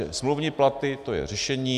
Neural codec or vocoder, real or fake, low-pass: none; real; 14.4 kHz